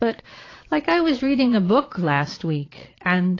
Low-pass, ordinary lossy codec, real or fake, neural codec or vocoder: 7.2 kHz; AAC, 32 kbps; fake; vocoder, 22.05 kHz, 80 mel bands, Vocos